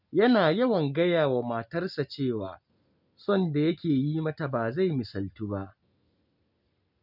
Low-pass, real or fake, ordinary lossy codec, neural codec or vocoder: 5.4 kHz; real; none; none